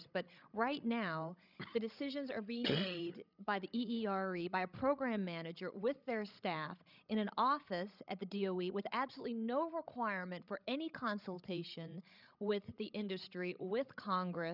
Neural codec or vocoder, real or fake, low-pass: codec, 16 kHz, 16 kbps, FreqCodec, larger model; fake; 5.4 kHz